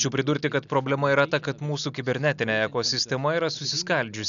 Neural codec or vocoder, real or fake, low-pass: none; real; 7.2 kHz